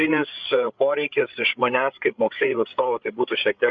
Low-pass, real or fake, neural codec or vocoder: 7.2 kHz; fake; codec, 16 kHz, 8 kbps, FreqCodec, larger model